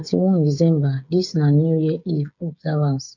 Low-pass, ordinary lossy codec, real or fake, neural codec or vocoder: 7.2 kHz; none; fake; codec, 16 kHz, 8 kbps, FreqCodec, smaller model